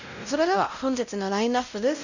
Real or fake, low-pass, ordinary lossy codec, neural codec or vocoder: fake; 7.2 kHz; none; codec, 16 kHz, 0.5 kbps, X-Codec, WavLM features, trained on Multilingual LibriSpeech